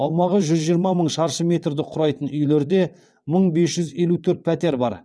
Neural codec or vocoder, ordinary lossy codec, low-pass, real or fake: vocoder, 22.05 kHz, 80 mel bands, WaveNeXt; none; none; fake